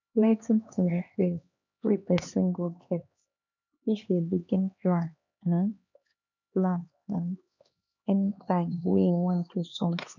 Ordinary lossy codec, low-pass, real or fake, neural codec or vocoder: none; 7.2 kHz; fake; codec, 16 kHz, 2 kbps, X-Codec, HuBERT features, trained on LibriSpeech